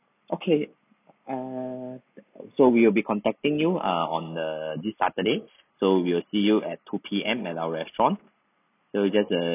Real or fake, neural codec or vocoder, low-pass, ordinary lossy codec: real; none; 3.6 kHz; none